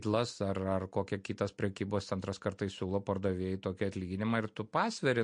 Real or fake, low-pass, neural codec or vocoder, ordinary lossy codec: real; 9.9 kHz; none; MP3, 48 kbps